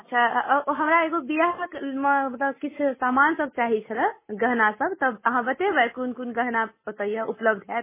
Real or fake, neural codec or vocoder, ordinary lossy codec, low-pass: real; none; MP3, 16 kbps; 3.6 kHz